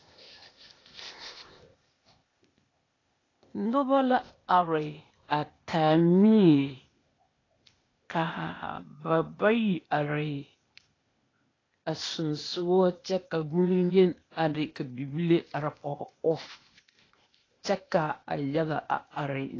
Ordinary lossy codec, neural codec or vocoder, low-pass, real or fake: AAC, 32 kbps; codec, 16 kHz, 0.8 kbps, ZipCodec; 7.2 kHz; fake